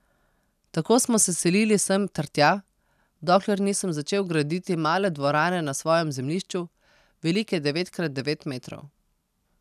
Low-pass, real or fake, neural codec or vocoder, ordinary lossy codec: 14.4 kHz; real; none; none